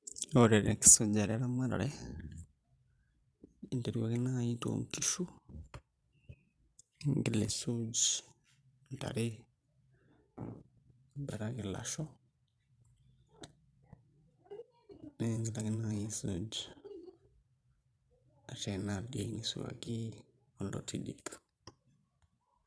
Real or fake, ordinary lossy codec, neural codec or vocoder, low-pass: fake; none; vocoder, 22.05 kHz, 80 mel bands, Vocos; none